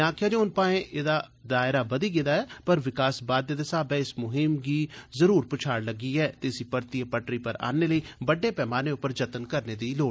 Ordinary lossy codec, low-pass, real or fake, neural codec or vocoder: none; none; real; none